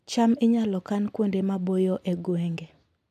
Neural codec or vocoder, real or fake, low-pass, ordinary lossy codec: none; real; 14.4 kHz; AAC, 96 kbps